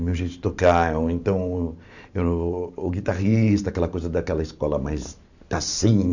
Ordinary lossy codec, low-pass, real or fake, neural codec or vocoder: none; 7.2 kHz; fake; vocoder, 44.1 kHz, 128 mel bands every 512 samples, BigVGAN v2